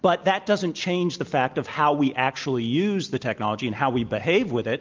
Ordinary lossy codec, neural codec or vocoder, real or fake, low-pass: Opus, 32 kbps; none; real; 7.2 kHz